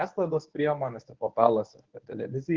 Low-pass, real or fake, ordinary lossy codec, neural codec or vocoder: 7.2 kHz; fake; Opus, 16 kbps; codec, 24 kHz, 0.9 kbps, WavTokenizer, medium speech release version 1